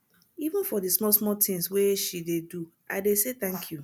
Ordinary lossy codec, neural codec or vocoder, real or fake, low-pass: none; none; real; none